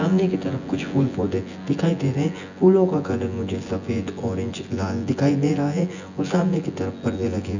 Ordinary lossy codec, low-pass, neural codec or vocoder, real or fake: none; 7.2 kHz; vocoder, 24 kHz, 100 mel bands, Vocos; fake